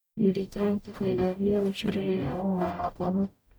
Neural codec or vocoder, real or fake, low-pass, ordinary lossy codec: codec, 44.1 kHz, 0.9 kbps, DAC; fake; none; none